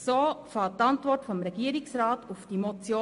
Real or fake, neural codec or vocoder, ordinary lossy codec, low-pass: real; none; MP3, 48 kbps; 10.8 kHz